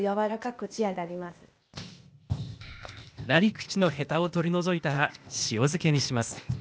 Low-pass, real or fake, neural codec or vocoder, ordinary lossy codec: none; fake; codec, 16 kHz, 0.8 kbps, ZipCodec; none